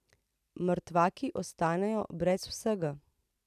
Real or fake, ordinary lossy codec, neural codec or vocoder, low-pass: real; none; none; 14.4 kHz